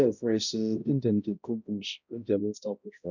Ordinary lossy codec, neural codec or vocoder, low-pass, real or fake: none; codec, 16 kHz, 0.5 kbps, X-Codec, HuBERT features, trained on balanced general audio; 7.2 kHz; fake